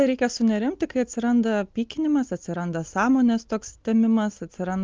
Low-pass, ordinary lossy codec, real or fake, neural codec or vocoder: 7.2 kHz; Opus, 24 kbps; real; none